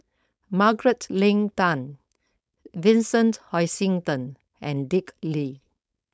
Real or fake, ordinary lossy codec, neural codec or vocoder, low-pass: fake; none; codec, 16 kHz, 4.8 kbps, FACodec; none